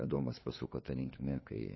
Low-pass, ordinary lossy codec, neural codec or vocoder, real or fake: 7.2 kHz; MP3, 24 kbps; codec, 16 kHz, 2 kbps, FunCodec, trained on LibriTTS, 25 frames a second; fake